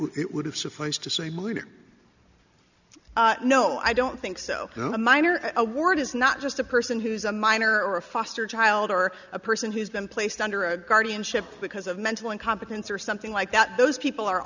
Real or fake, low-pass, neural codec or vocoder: real; 7.2 kHz; none